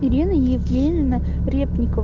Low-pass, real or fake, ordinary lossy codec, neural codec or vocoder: 7.2 kHz; real; Opus, 32 kbps; none